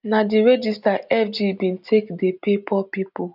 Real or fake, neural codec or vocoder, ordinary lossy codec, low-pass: real; none; none; 5.4 kHz